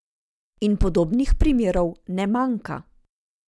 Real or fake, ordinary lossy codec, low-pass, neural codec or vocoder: real; none; none; none